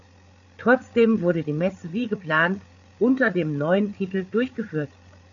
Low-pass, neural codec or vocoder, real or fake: 7.2 kHz; codec, 16 kHz, 8 kbps, FreqCodec, larger model; fake